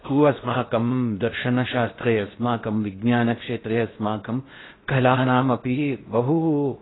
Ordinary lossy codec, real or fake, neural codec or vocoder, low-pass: AAC, 16 kbps; fake; codec, 16 kHz in and 24 kHz out, 0.6 kbps, FocalCodec, streaming, 4096 codes; 7.2 kHz